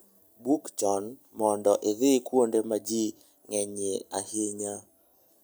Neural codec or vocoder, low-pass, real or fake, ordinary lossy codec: none; none; real; none